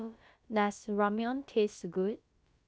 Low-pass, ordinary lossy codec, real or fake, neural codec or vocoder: none; none; fake; codec, 16 kHz, about 1 kbps, DyCAST, with the encoder's durations